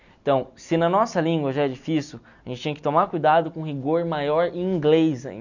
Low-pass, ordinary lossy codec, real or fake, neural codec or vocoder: 7.2 kHz; none; real; none